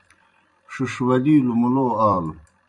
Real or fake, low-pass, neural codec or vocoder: fake; 10.8 kHz; vocoder, 44.1 kHz, 128 mel bands every 256 samples, BigVGAN v2